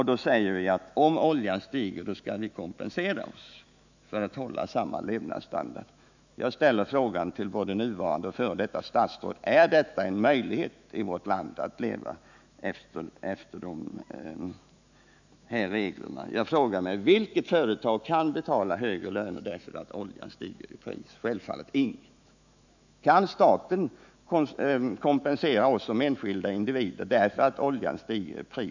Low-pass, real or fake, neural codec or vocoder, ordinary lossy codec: 7.2 kHz; fake; autoencoder, 48 kHz, 128 numbers a frame, DAC-VAE, trained on Japanese speech; none